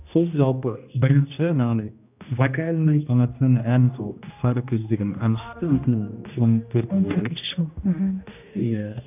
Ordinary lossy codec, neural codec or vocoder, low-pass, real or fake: none; codec, 16 kHz, 1 kbps, X-Codec, HuBERT features, trained on general audio; 3.6 kHz; fake